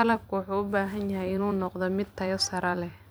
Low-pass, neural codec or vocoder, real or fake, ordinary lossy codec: none; vocoder, 44.1 kHz, 128 mel bands every 256 samples, BigVGAN v2; fake; none